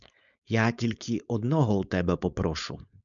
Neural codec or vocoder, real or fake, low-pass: codec, 16 kHz, 4.8 kbps, FACodec; fake; 7.2 kHz